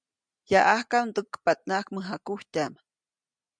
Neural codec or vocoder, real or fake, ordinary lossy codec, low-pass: none; real; MP3, 96 kbps; 9.9 kHz